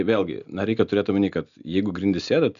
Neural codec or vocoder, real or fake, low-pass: none; real; 7.2 kHz